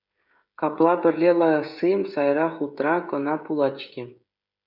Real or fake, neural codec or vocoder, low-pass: fake; codec, 16 kHz, 16 kbps, FreqCodec, smaller model; 5.4 kHz